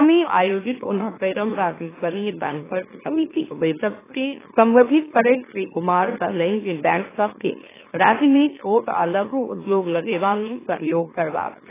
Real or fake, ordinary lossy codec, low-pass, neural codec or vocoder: fake; AAC, 16 kbps; 3.6 kHz; autoencoder, 44.1 kHz, a latent of 192 numbers a frame, MeloTTS